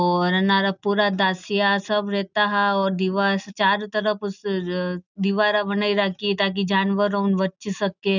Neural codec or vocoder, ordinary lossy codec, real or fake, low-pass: none; none; real; 7.2 kHz